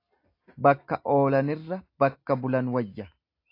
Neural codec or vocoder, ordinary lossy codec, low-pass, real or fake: none; AAC, 32 kbps; 5.4 kHz; real